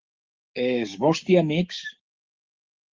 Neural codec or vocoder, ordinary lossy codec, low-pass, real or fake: codec, 16 kHz, 6 kbps, DAC; Opus, 32 kbps; 7.2 kHz; fake